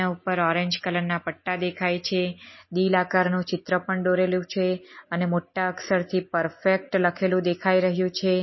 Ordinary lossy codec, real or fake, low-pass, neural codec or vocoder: MP3, 24 kbps; real; 7.2 kHz; none